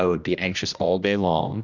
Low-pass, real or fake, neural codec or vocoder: 7.2 kHz; fake; codec, 16 kHz, 1 kbps, X-Codec, HuBERT features, trained on general audio